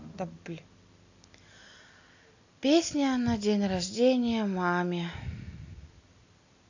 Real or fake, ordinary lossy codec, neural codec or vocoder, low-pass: real; AAC, 48 kbps; none; 7.2 kHz